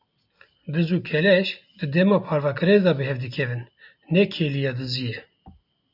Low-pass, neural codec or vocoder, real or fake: 5.4 kHz; none; real